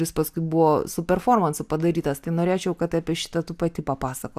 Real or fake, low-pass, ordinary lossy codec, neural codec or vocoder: real; 14.4 kHz; AAC, 96 kbps; none